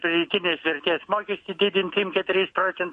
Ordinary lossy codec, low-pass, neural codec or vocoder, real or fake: MP3, 48 kbps; 14.4 kHz; autoencoder, 48 kHz, 128 numbers a frame, DAC-VAE, trained on Japanese speech; fake